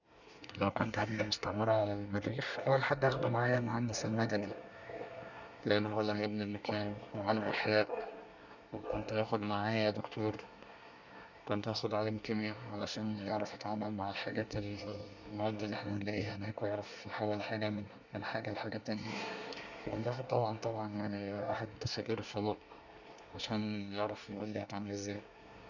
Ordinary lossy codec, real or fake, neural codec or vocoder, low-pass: none; fake; codec, 24 kHz, 1 kbps, SNAC; 7.2 kHz